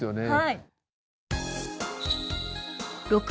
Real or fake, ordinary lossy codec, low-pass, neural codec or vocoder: real; none; none; none